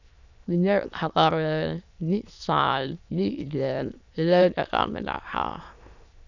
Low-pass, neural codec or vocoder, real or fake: 7.2 kHz; autoencoder, 22.05 kHz, a latent of 192 numbers a frame, VITS, trained on many speakers; fake